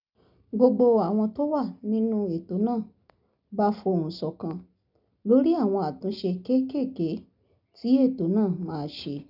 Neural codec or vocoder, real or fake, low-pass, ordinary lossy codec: none; real; 5.4 kHz; none